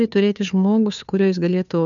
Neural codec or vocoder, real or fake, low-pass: codec, 16 kHz, 2 kbps, FunCodec, trained on Chinese and English, 25 frames a second; fake; 7.2 kHz